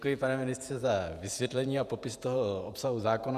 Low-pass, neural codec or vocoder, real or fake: 14.4 kHz; vocoder, 48 kHz, 128 mel bands, Vocos; fake